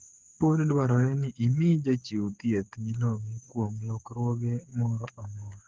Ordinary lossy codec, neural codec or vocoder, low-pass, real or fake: Opus, 16 kbps; codec, 16 kHz, 8 kbps, FreqCodec, smaller model; 7.2 kHz; fake